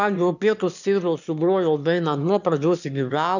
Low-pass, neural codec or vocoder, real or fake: 7.2 kHz; autoencoder, 22.05 kHz, a latent of 192 numbers a frame, VITS, trained on one speaker; fake